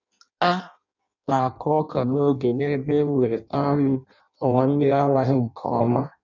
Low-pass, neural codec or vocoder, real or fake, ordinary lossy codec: 7.2 kHz; codec, 16 kHz in and 24 kHz out, 0.6 kbps, FireRedTTS-2 codec; fake; none